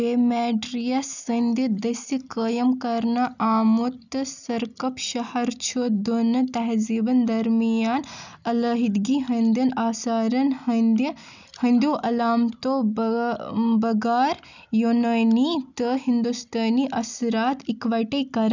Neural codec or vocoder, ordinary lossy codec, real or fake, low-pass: none; none; real; 7.2 kHz